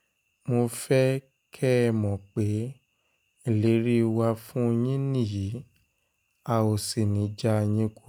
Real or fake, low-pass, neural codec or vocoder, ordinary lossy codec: real; none; none; none